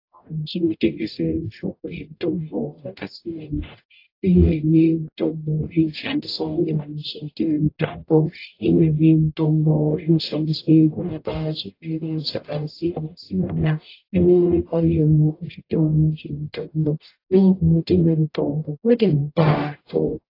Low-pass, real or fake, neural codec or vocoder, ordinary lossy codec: 5.4 kHz; fake; codec, 44.1 kHz, 0.9 kbps, DAC; AAC, 32 kbps